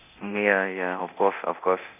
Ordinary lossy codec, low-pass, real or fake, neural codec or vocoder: none; 3.6 kHz; fake; codec, 24 kHz, 0.9 kbps, DualCodec